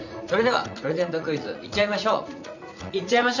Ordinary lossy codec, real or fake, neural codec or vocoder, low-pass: none; fake; vocoder, 44.1 kHz, 80 mel bands, Vocos; 7.2 kHz